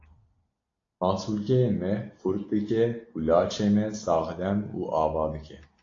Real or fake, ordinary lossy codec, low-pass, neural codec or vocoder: real; AAC, 32 kbps; 7.2 kHz; none